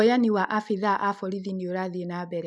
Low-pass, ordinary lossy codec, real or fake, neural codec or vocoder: none; none; real; none